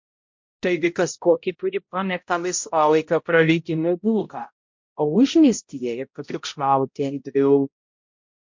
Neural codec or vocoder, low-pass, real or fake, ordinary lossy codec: codec, 16 kHz, 0.5 kbps, X-Codec, HuBERT features, trained on balanced general audio; 7.2 kHz; fake; MP3, 48 kbps